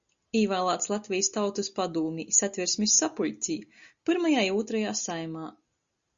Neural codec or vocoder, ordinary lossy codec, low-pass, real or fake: none; Opus, 64 kbps; 7.2 kHz; real